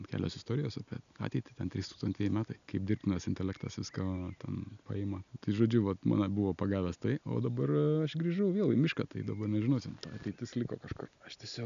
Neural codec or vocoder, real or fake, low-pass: none; real; 7.2 kHz